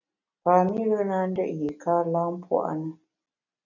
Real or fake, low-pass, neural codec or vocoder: real; 7.2 kHz; none